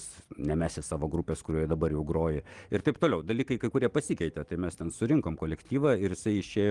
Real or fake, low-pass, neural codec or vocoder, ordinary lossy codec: real; 10.8 kHz; none; Opus, 24 kbps